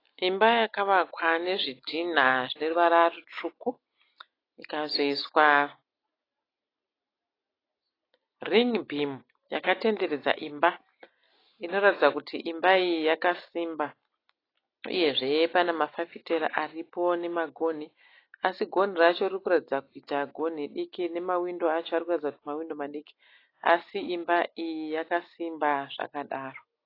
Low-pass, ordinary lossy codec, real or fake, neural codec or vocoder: 5.4 kHz; AAC, 24 kbps; real; none